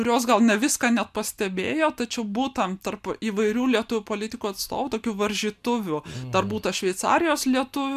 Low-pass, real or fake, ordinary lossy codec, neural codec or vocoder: 14.4 kHz; real; MP3, 96 kbps; none